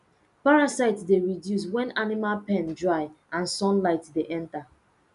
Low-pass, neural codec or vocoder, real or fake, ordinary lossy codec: 10.8 kHz; none; real; none